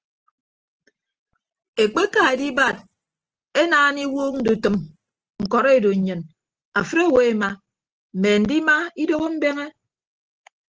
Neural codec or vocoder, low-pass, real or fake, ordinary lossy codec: none; 7.2 kHz; real; Opus, 16 kbps